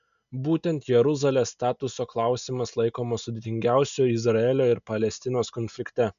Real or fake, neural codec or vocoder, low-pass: real; none; 7.2 kHz